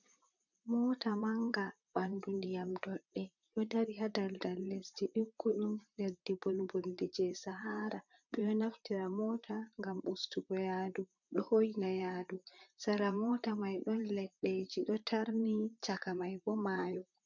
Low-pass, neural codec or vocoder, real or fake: 7.2 kHz; vocoder, 44.1 kHz, 128 mel bands, Pupu-Vocoder; fake